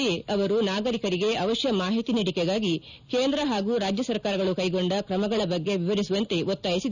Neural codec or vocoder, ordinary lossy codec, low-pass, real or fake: none; none; 7.2 kHz; real